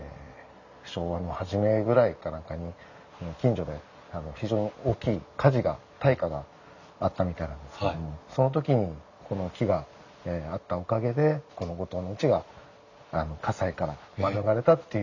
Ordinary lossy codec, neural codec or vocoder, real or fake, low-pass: none; none; real; 7.2 kHz